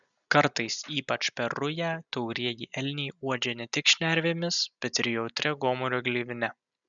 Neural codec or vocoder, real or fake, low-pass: none; real; 7.2 kHz